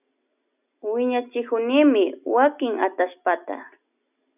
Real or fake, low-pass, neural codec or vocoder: real; 3.6 kHz; none